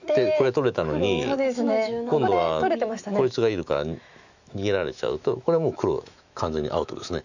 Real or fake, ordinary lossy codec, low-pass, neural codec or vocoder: fake; none; 7.2 kHz; autoencoder, 48 kHz, 128 numbers a frame, DAC-VAE, trained on Japanese speech